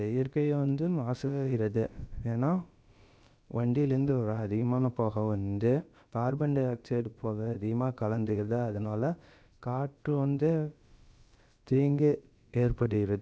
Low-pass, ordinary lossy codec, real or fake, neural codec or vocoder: none; none; fake; codec, 16 kHz, about 1 kbps, DyCAST, with the encoder's durations